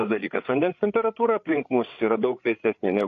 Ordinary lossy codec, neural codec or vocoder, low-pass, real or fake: AAC, 48 kbps; codec, 16 kHz, 8 kbps, FreqCodec, larger model; 7.2 kHz; fake